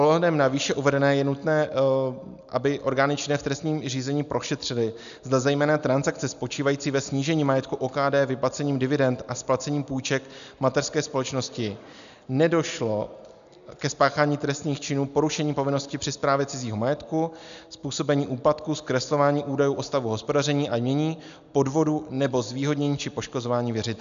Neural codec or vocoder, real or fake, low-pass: none; real; 7.2 kHz